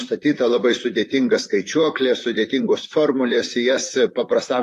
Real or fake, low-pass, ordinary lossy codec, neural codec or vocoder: fake; 14.4 kHz; AAC, 48 kbps; vocoder, 44.1 kHz, 128 mel bands, Pupu-Vocoder